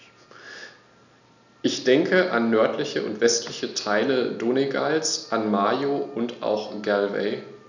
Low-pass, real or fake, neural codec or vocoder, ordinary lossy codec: 7.2 kHz; real; none; none